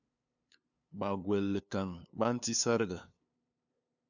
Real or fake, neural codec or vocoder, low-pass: fake; codec, 16 kHz, 2 kbps, FunCodec, trained on LibriTTS, 25 frames a second; 7.2 kHz